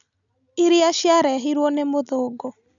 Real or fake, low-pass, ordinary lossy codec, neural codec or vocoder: real; 7.2 kHz; none; none